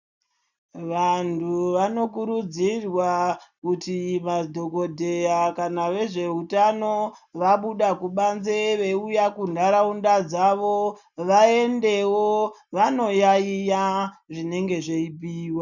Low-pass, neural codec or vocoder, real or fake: 7.2 kHz; none; real